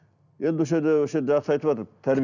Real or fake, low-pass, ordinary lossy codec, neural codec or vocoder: real; 7.2 kHz; none; none